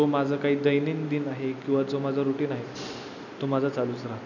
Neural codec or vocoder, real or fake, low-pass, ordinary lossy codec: none; real; 7.2 kHz; none